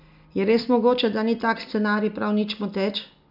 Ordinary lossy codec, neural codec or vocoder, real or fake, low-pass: none; none; real; 5.4 kHz